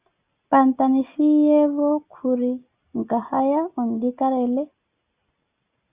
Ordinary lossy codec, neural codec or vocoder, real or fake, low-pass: Opus, 64 kbps; none; real; 3.6 kHz